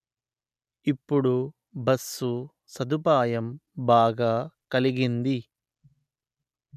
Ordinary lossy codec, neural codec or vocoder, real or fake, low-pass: none; none; real; 14.4 kHz